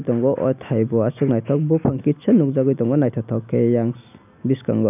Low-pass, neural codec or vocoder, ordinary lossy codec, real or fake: 3.6 kHz; none; none; real